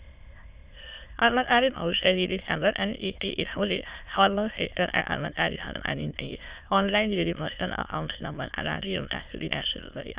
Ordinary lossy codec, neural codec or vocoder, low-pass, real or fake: Opus, 64 kbps; autoencoder, 22.05 kHz, a latent of 192 numbers a frame, VITS, trained on many speakers; 3.6 kHz; fake